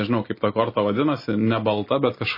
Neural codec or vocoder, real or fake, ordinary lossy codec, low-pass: none; real; MP3, 24 kbps; 5.4 kHz